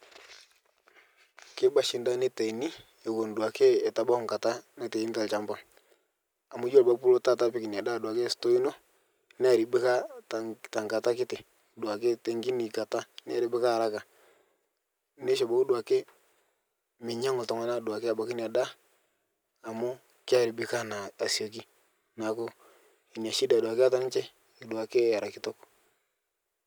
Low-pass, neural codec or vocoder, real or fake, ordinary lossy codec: none; none; real; none